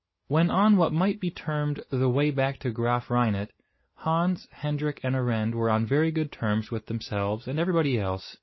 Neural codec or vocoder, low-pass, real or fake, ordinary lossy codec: none; 7.2 kHz; real; MP3, 24 kbps